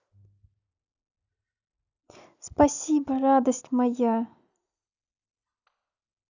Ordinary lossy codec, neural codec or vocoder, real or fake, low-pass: none; none; real; 7.2 kHz